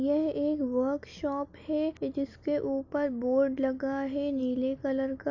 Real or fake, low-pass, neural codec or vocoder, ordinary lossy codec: real; 7.2 kHz; none; none